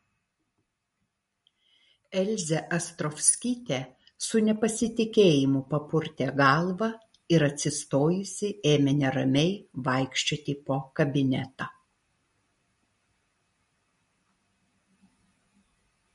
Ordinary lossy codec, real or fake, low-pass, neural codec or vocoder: MP3, 48 kbps; real; 19.8 kHz; none